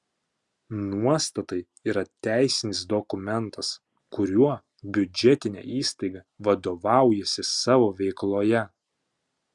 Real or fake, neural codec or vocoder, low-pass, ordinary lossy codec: real; none; 10.8 kHz; Opus, 64 kbps